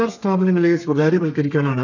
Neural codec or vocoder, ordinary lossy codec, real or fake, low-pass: codec, 32 kHz, 1.9 kbps, SNAC; none; fake; 7.2 kHz